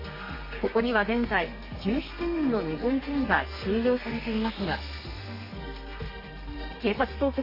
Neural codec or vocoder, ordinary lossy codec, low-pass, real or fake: codec, 32 kHz, 1.9 kbps, SNAC; MP3, 24 kbps; 5.4 kHz; fake